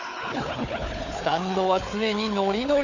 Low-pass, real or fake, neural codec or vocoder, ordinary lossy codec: 7.2 kHz; fake; codec, 16 kHz, 4 kbps, FunCodec, trained on Chinese and English, 50 frames a second; none